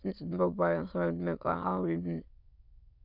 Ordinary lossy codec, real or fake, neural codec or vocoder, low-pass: none; fake; autoencoder, 22.05 kHz, a latent of 192 numbers a frame, VITS, trained on many speakers; 5.4 kHz